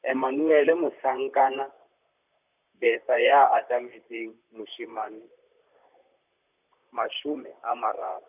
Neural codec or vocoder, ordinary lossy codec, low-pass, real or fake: vocoder, 44.1 kHz, 128 mel bands, Pupu-Vocoder; none; 3.6 kHz; fake